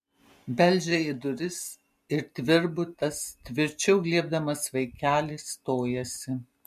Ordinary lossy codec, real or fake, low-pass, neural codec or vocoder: MP3, 64 kbps; real; 14.4 kHz; none